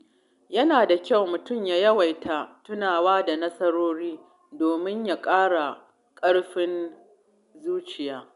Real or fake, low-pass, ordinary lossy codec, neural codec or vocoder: real; 10.8 kHz; none; none